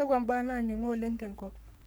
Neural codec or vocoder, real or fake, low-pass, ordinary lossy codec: codec, 44.1 kHz, 3.4 kbps, Pupu-Codec; fake; none; none